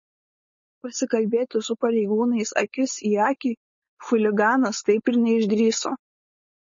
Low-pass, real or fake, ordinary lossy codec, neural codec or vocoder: 7.2 kHz; fake; MP3, 32 kbps; codec, 16 kHz, 4.8 kbps, FACodec